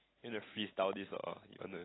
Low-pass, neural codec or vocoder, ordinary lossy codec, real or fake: 7.2 kHz; none; AAC, 16 kbps; real